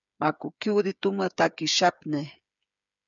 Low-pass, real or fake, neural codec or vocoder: 7.2 kHz; fake; codec, 16 kHz, 8 kbps, FreqCodec, smaller model